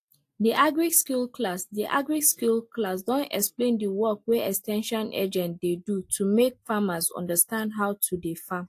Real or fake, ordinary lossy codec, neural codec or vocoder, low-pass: real; AAC, 64 kbps; none; 14.4 kHz